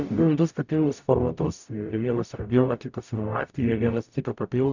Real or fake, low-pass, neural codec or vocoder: fake; 7.2 kHz; codec, 44.1 kHz, 0.9 kbps, DAC